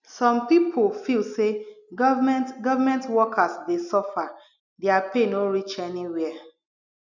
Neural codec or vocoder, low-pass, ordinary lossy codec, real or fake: none; 7.2 kHz; none; real